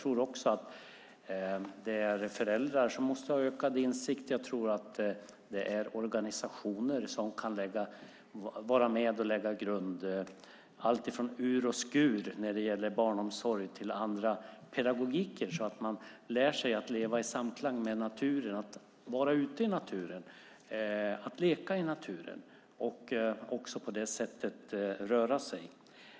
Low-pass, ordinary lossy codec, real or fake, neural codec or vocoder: none; none; real; none